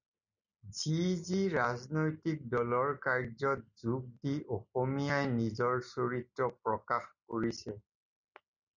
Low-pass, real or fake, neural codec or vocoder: 7.2 kHz; real; none